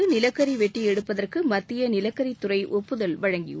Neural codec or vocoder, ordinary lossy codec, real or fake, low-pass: none; none; real; none